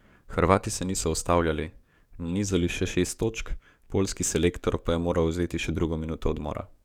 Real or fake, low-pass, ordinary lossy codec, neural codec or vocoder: fake; 19.8 kHz; none; codec, 44.1 kHz, 7.8 kbps, DAC